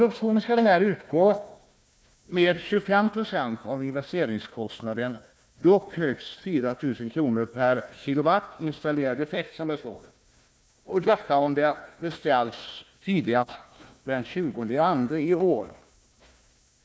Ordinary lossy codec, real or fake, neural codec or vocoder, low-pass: none; fake; codec, 16 kHz, 1 kbps, FunCodec, trained on Chinese and English, 50 frames a second; none